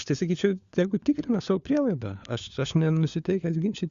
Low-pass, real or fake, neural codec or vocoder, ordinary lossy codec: 7.2 kHz; fake; codec, 16 kHz, 4 kbps, FunCodec, trained on LibriTTS, 50 frames a second; Opus, 64 kbps